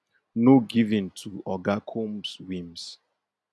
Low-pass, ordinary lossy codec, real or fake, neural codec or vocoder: none; none; real; none